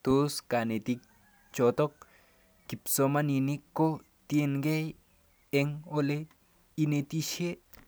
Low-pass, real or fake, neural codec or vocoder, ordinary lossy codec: none; real; none; none